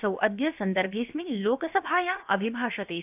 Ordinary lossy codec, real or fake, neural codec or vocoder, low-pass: AAC, 32 kbps; fake; codec, 16 kHz, about 1 kbps, DyCAST, with the encoder's durations; 3.6 kHz